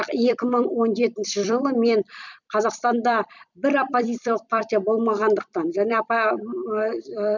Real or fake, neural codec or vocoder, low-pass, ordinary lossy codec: fake; vocoder, 44.1 kHz, 128 mel bands every 256 samples, BigVGAN v2; 7.2 kHz; none